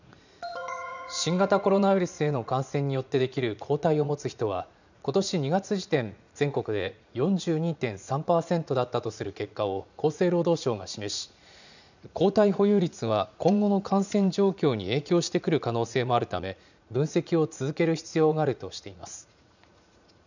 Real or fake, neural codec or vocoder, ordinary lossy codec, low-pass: fake; vocoder, 44.1 kHz, 80 mel bands, Vocos; none; 7.2 kHz